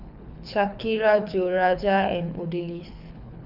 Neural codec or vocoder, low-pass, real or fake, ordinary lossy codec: codec, 24 kHz, 6 kbps, HILCodec; 5.4 kHz; fake; none